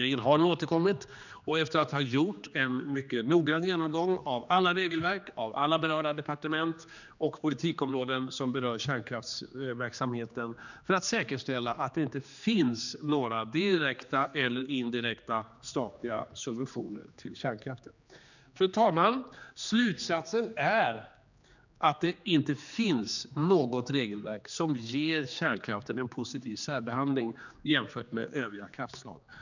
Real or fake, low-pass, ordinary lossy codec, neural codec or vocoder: fake; 7.2 kHz; none; codec, 16 kHz, 2 kbps, X-Codec, HuBERT features, trained on general audio